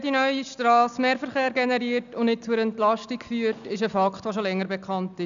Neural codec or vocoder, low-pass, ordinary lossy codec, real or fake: none; 7.2 kHz; none; real